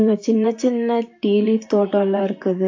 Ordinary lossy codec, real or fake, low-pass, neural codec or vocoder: AAC, 48 kbps; fake; 7.2 kHz; vocoder, 44.1 kHz, 128 mel bands, Pupu-Vocoder